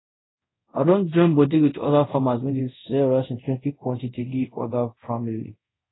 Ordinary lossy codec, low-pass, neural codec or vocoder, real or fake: AAC, 16 kbps; 7.2 kHz; codec, 24 kHz, 0.5 kbps, DualCodec; fake